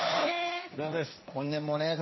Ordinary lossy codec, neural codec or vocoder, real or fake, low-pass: MP3, 24 kbps; codec, 16 kHz, 1.1 kbps, Voila-Tokenizer; fake; 7.2 kHz